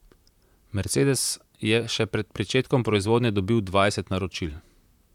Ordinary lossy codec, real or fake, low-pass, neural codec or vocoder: none; fake; 19.8 kHz; vocoder, 44.1 kHz, 128 mel bands, Pupu-Vocoder